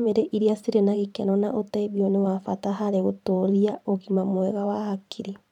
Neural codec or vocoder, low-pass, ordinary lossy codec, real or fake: vocoder, 44.1 kHz, 128 mel bands every 512 samples, BigVGAN v2; 19.8 kHz; none; fake